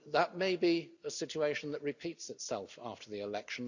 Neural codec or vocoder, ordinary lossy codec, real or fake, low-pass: none; none; real; 7.2 kHz